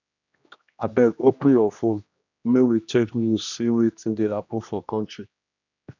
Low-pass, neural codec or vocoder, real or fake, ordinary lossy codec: 7.2 kHz; codec, 16 kHz, 1 kbps, X-Codec, HuBERT features, trained on general audio; fake; none